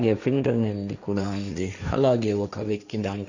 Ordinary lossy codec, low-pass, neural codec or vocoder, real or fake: none; 7.2 kHz; codec, 16 kHz, 1.1 kbps, Voila-Tokenizer; fake